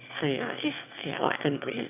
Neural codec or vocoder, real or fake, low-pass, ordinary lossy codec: autoencoder, 22.05 kHz, a latent of 192 numbers a frame, VITS, trained on one speaker; fake; 3.6 kHz; none